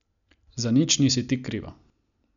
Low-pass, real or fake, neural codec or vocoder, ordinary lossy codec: 7.2 kHz; real; none; none